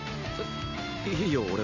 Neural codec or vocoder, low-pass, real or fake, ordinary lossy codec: none; 7.2 kHz; real; none